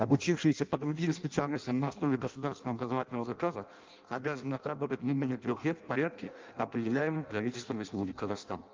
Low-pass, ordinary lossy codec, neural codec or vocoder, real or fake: 7.2 kHz; Opus, 24 kbps; codec, 16 kHz in and 24 kHz out, 0.6 kbps, FireRedTTS-2 codec; fake